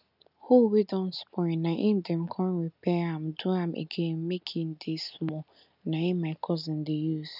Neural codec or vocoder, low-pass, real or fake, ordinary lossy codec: none; 5.4 kHz; real; none